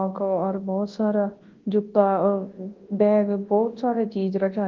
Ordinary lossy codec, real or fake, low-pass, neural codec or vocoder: Opus, 16 kbps; fake; 7.2 kHz; codec, 24 kHz, 0.9 kbps, WavTokenizer, large speech release